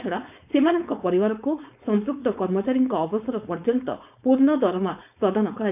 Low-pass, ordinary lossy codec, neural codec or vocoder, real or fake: 3.6 kHz; none; codec, 16 kHz, 4.8 kbps, FACodec; fake